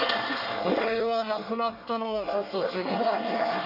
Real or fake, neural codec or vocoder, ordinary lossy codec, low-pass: fake; codec, 24 kHz, 1 kbps, SNAC; none; 5.4 kHz